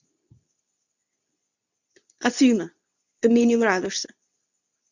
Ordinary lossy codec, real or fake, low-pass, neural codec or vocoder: none; fake; 7.2 kHz; codec, 24 kHz, 0.9 kbps, WavTokenizer, medium speech release version 2